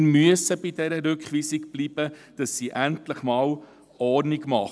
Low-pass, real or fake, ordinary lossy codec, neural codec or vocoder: none; real; none; none